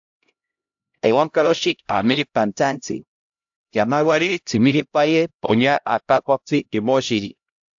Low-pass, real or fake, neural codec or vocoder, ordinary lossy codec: 7.2 kHz; fake; codec, 16 kHz, 0.5 kbps, X-Codec, HuBERT features, trained on LibriSpeech; AAC, 64 kbps